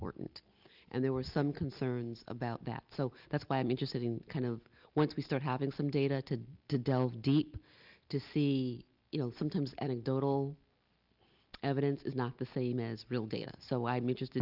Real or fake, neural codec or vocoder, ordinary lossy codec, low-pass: real; none; Opus, 24 kbps; 5.4 kHz